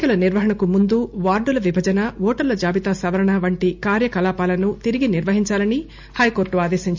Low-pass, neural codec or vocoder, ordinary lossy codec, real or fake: 7.2 kHz; none; MP3, 64 kbps; real